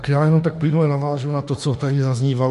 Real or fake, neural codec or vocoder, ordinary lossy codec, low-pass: fake; autoencoder, 48 kHz, 32 numbers a frame, DAC-VAE, trained on Japanese speech; MP3, 48 kbps; 14.4 kHz